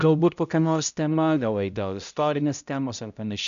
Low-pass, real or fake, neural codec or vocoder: 7.2 kHz; fake; codec, 16 kHz, 0.5 kbps, X-Codec, HuBERT features, trained on balanced general audio